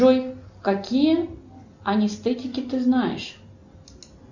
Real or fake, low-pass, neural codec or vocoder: real; 7.2 kHz; none